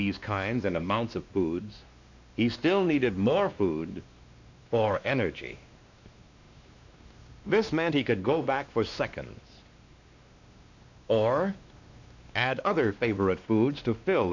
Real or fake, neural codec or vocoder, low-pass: fake; codec, 16 kHz, 2 kbps, X-Codec, WavLM features, trained on Multilingual LibriSpeech; 7.2 kHz